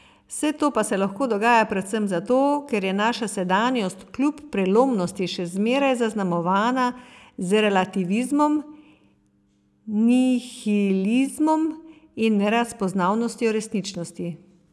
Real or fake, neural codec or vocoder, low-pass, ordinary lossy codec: real; none; none; none